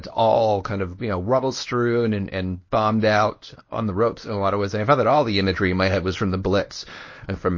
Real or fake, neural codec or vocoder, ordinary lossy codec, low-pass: fake; codec, 24 kHz, 0.9 kbps, WavTokenizer, medium speech release version 1; MP3, 32 kbps; 7.2 kHz